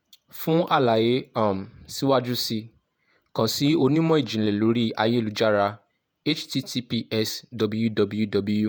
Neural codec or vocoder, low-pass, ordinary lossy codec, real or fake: vocoder, 48 kHz, 128 mel bands, Vocos; none; none; fake